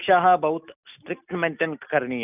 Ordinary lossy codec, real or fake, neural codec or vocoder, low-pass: none; real; none; 3.6 kHz